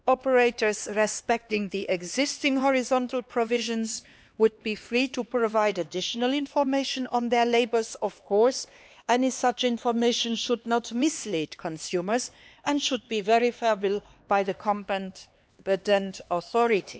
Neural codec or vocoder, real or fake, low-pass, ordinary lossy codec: codec, 16 kHz, 2 kbps, X-Codec, HuBERT features, trained on LibriSpeech; fake; none; none